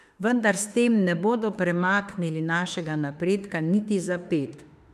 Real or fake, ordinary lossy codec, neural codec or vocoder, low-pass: fake; none; autoencoder, 48 kHz, 32 numbers a frame, DAC-VAE, trained on Japanese speech; 14.4 kHz